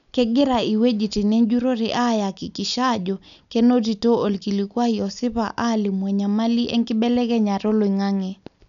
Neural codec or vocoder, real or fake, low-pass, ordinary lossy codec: none; real; 7.2 kHz; none